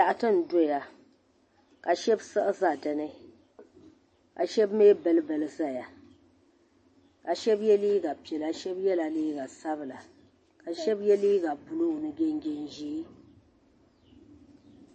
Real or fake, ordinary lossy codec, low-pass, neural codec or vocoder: real; MP3, 32 kbps; 9.9 kHz; none